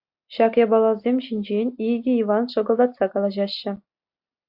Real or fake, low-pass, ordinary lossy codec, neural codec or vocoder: real; 5.4 kHz; AAC, 48 kbps; none